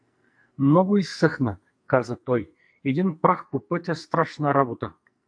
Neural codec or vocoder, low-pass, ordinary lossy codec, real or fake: codec, 44.1 kHz, 2.6 kbps, SNAC; 9.9 kHz; AAC, 64 kbps; fake